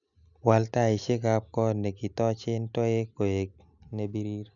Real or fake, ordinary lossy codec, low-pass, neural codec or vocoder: real; none; 7.2 kHz; none